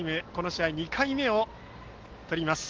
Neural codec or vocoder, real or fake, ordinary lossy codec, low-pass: none; real; Opus, 16 kbps; 7.2 kHz